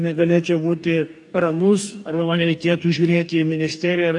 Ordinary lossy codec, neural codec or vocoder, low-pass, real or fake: AAC, 64 kbps; codec, 44.1 kHz, 2.6 kbps, DAC; 10.8 kHz; fake